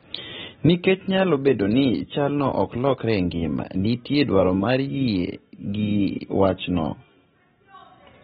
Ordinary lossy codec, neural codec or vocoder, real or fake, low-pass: AAC, 16 kbps; none; real; 14.4 kHz